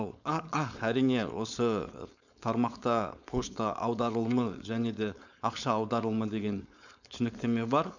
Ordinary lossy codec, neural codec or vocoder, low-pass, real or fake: none; codec, 16 kHz, 4.8 kbps, FACodec; 7.2 kHz; fake